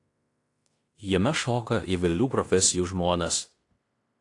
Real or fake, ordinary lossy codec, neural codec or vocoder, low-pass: fake; AAC, 48 kbps; codec, 16 kHz in and 24 kHz out, 0.9 kbps, LongCat-Audio-Codec, fine tuned four codebook decoder; 10.8 kHz